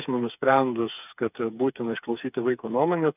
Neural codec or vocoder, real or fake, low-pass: codec, 16 kHz, 4 kbps, FreqCodec, smaller model; fake; 3.6 kHz